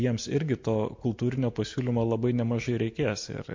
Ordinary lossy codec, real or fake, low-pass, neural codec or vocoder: MP3, 48 kbps; real; 7.2 kHz; none